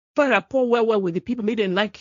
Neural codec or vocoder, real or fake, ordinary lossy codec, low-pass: codec, 16 kHz, 1.1 kbps, Voila-Tokenizer; fake; none; 7.2 kHz